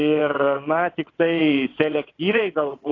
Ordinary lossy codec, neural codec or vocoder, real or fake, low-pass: AAC, 32 kbps; vocoder, 22.05 kHz, 80 mel bands, WaveNeXt; fake; 7.2 kHz